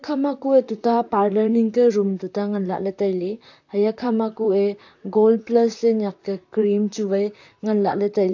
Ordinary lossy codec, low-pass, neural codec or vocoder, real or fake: AAC, 48 kbps; 7.2 kHz; vocoder, 44.1 kHz, 128 mel bands, Pupu-Vocoder; fake